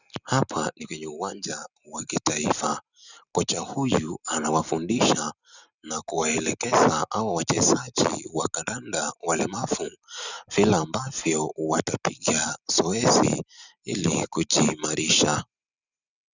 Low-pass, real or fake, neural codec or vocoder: 7.2 kHz; real; none